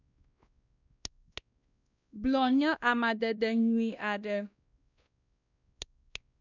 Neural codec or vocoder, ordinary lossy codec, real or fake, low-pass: codec, 16 kHz, 1 kbps, X-Codec, WavLM features, trained on Multilingual LibriSpeech; none; fake; 7.2 kHz